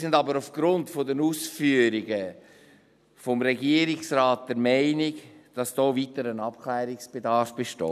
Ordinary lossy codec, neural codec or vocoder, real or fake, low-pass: none; none; real; 14.4 kHz